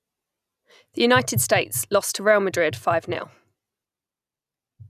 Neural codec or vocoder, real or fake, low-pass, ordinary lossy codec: none; real; 14.4 kHz; none